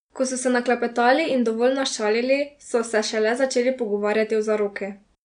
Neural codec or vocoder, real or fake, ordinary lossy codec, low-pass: none; real; none; 9.9 kHz